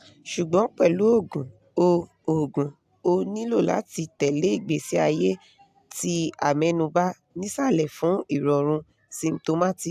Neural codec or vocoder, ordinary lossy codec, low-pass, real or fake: none; AAC, 96 kbps; 14.4 kHz; real